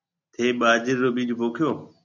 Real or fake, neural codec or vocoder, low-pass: real; none; 7.2 kHz